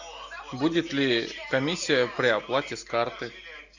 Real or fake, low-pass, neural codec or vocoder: real; 7.2 kHz; none